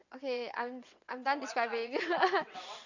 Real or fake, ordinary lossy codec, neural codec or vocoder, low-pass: fake; none; vocoder, 22.05 kHz, 80 mel bands, WaveNeXt; 7.2 kHz